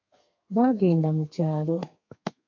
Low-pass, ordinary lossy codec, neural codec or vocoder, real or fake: 7.2 kHz; MP3, 48 kbps; codec, 32 kHz, 1.9 kbps, SNAC; fake